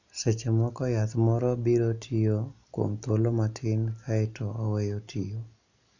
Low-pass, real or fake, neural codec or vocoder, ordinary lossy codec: 7.2 kHz; real; none; none